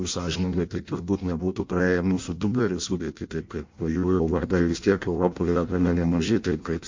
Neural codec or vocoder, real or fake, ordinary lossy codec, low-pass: codec, 16 kHz in and 24 kHz out, 0.6 kbps, FireRedTTS-2 codec; fake; MP3, 48 kbps; 7.2 kHz